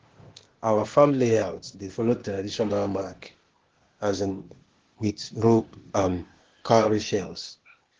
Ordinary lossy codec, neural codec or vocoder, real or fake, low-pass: Opus, 16 kbps; codec, 16 kHz, 0.8 kbps, ZipCodec; fake; 7.2 kHz